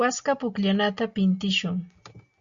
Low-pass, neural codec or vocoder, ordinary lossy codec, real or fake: 7.2 kHz; none; Opus, 64 kbps; real